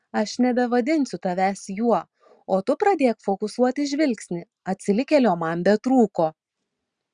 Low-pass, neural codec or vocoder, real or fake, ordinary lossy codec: 9.9 kHz; none; real; Opus, 64 kbps